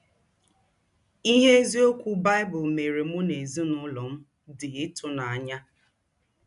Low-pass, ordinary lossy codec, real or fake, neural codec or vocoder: 10.8 kHz; none; real; none